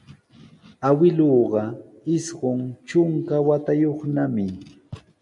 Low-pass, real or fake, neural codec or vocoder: 10.8 kHz; real; none